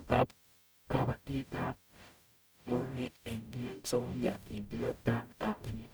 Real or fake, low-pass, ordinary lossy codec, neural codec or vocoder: fake; none; none; codec, 44.1 kHz, 0.9 kbps, DAC